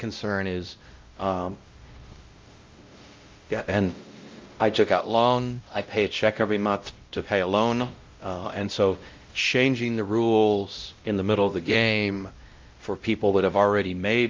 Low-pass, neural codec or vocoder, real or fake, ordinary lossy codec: 7.2 kHz; codec, 16 kHz, 0.5 kbps, X-Codec, WavLM features, trained on Multilingual LibriSpeech; fake; Opus, 24 kbps